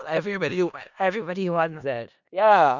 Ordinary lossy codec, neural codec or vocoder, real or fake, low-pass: none; codec, 16 kHz in and 24 kHz out, 0.4 kbps, LongCat-Audio-Codec, four codebook decoder; fake; 7.2 kHz